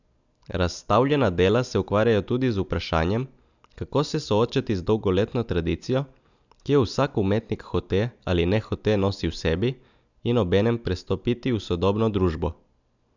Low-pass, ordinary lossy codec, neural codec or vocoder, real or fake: 7.2 kHz; none; none; real